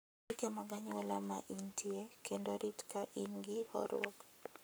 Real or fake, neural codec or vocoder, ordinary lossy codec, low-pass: fake; codec, 44.1 kHz, 7.8 kbps, Pupu-Codec; none; none